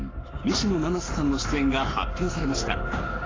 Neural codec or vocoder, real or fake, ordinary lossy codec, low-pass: codec, 44.1 kHz, 7.8 kbps, Pupu-Codec; fake; AAC, 32 kbps; 7.2 kHz